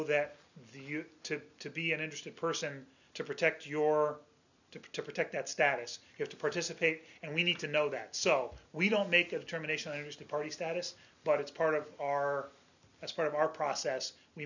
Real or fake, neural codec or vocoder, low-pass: real; none; 7.2 kHz